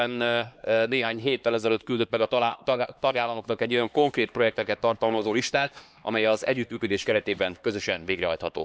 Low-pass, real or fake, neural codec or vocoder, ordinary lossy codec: none; fake; codec, 16 kHz, 2 kbps, X-Codec, HuBERT features, trained on LibriSpeech; none